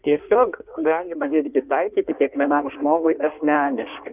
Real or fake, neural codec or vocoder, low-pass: fake; codec, 16 kHz in and 24 kHz out, 1.1 kbps, FireRedTTS-2 codec; 3.6 kHz